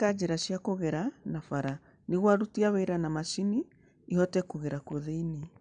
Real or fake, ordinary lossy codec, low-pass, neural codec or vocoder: real; none; 9.9 kHz; none